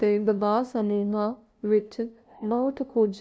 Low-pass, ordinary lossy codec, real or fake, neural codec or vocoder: none; none; fake; codec, 16 kHz, 0.5 kbps, FunCodec, trained on LibriTTS, 25 frames a second